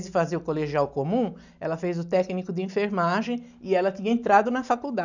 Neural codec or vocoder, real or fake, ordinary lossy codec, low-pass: none; real; none; 7.2 kHz